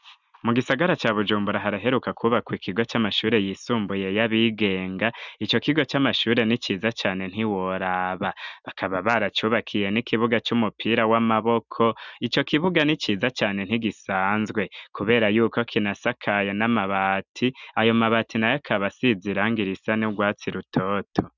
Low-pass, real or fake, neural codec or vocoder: 7.2 kHz; real; none